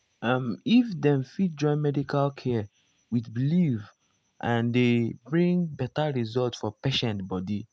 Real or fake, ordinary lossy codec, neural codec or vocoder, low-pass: real; none; none; none